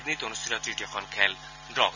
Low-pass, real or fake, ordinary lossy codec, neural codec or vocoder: 7.2 kHz; real; none; none